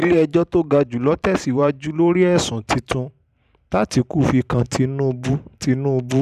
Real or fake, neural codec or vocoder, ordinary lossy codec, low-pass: fake; vocoder, 44.1 kHz, 128 mel bands every 512 samples, BigVGAN v2; none; 14.4 kHz